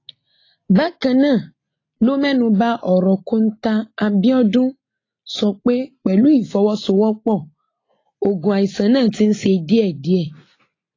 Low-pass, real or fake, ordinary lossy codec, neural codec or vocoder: 7.2 kHz; real; AAC, 32 kbps; none